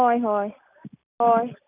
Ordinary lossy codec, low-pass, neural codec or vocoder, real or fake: none; 3.6 kHz; none; real